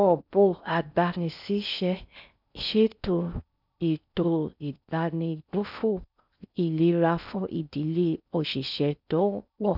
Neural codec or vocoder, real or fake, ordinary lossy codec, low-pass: codec, 16 kHz in and 24 kHz out, 0.6 kbps, FocalCodec, streaming, 4096 codes; fake; none; 5.4 kHz